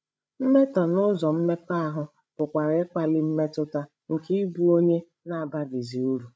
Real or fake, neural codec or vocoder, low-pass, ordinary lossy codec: fake; codec, 16 kHz, 16 kbps, FreqCodec, larger model; none; none